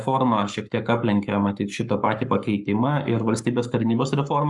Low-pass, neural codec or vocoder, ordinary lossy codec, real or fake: 10.8 kHz; codec, 44.1 kHz, 7.8 kbps, Pupu-Codec; Opus, 64 kbps; fake